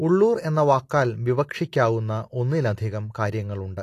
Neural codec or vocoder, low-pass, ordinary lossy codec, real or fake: none; 14.4 kHz; AAC, 48 kbps; real